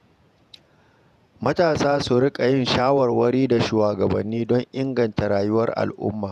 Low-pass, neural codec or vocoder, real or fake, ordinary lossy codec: 14.4 kHz; vocoder, 48 kHz, 128 mel bands, Vocos; fake; none